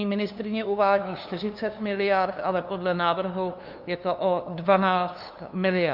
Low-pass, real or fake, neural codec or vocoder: 5.4 kHz; fake; codec, 16 kHz, 2 kbps, FunCodec, trained on LibriTTS, 25 frames a second